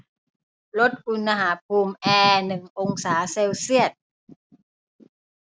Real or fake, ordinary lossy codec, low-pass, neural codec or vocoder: real; none; none; none